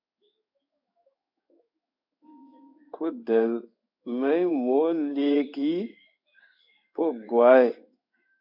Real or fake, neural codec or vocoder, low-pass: fake; codec, 16 kHz in and 24 kHz out, 1 kbps, XY-Tokenizer; 5.4 kHz